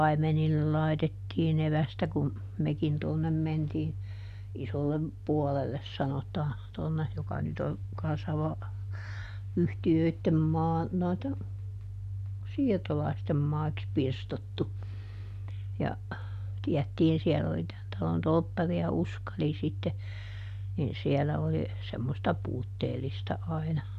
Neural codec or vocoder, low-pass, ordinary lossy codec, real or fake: none; 14.4 kHz; none; real